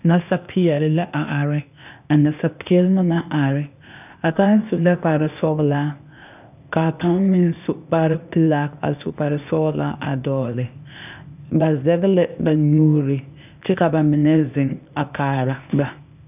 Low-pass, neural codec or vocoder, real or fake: 3.6 kHz; codec, 16 kHz, 0.8 kbps, ZipCodec; fake